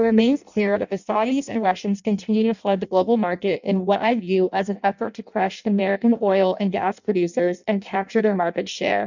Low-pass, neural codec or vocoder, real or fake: 7.2 kHz; codec, 16 kHz in and 24 kHz out, 0.6 kbps, FireRedTTS-2 codec; fake